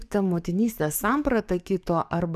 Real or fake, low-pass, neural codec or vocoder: fake; 14.4 kHz; codec, 44.1 kHz, 7.8 kbps, DAC